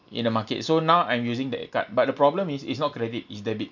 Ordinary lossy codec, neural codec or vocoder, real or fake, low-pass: none; none; real; 7.2 kHz